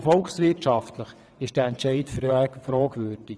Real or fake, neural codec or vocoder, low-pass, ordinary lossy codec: fake; vocoder, 22.05 kHz, 80 mel bands, WaveNeXt; none; none